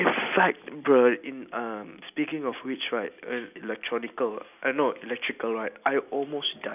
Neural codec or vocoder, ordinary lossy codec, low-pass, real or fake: none; none; 3.6 kHz; real